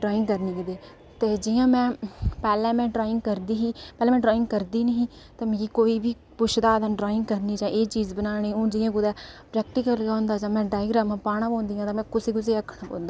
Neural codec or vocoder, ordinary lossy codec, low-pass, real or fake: none; none; none; real